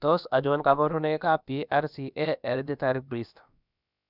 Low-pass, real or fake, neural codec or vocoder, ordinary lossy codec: 5.4 kHz; fake; codec, 16 kHz, about 1 kbps, DyCAST, with the encoder's durations; none